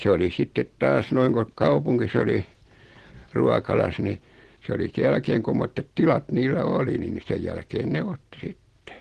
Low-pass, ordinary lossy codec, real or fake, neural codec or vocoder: 10.8 kHz; Opus, 16 kbps; real; none